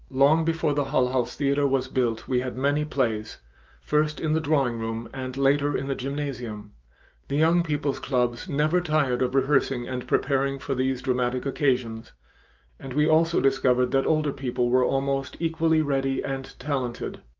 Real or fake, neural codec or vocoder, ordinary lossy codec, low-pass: fake; autoencoder, 48 kHz, 128 numbers a frame, DAC-VAE, trained on Japanese speech; Opus, 24 kbps; 7.2 kHz